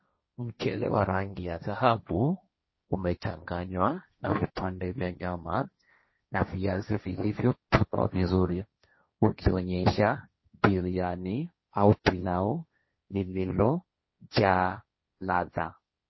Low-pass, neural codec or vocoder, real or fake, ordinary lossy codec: 7.2 kHz; codec, 16 kHz, 1.1 kbps, Voila-Tokenizer; fake; MP3, 24 kbps